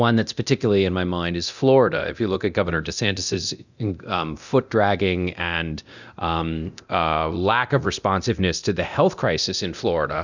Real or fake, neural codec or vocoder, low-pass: fake; codec, 24 kHz, 0.9 kbps, DualCodec; 7.2 kHz